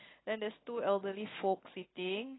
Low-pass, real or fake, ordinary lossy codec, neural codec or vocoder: 7.2 kHz; real; AAC, 16 kbps; none